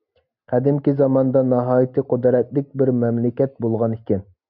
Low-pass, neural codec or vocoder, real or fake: 5.4 kHz; none; real